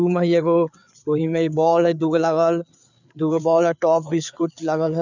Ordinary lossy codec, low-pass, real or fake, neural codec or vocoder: none; 7.2 kHz; fake; codec, 16 kHz, 4 kbps, FreqCodec, larger model